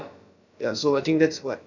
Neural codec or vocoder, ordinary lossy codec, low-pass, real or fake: codec, 16 kHz, about 1 kbps, DyCAST, with the encoder's durations; none; 7.2 kHz; fake